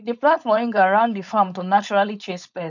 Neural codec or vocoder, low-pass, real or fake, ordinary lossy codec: codec, 16 kHz, 4.8 kbps, FACodec; 7.2 kHz; fake; none